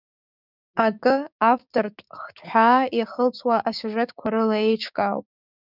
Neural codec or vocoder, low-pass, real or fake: codec, 16 kHz, 6 kbps, DAC; 5.4 kHz; fake